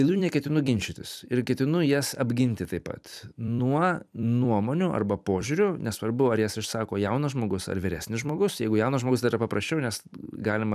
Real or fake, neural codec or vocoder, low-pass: fake; vocoder, 44.1 kHz, 128 mel bands every 256 samples, BigVGAN v2; 14.4 kHz